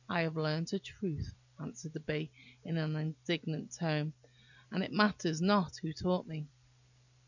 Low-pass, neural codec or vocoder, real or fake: 7.2 kHz; none; real